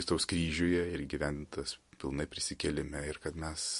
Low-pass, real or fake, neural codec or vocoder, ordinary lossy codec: 14.4 kHz; real; none; MP3, 48 kbps